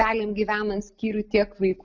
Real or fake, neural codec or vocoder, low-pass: real; none; 7.2 kHz